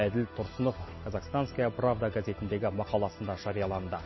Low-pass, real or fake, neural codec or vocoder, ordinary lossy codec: 7.2 kHz; real; none; MP3, 24 kbps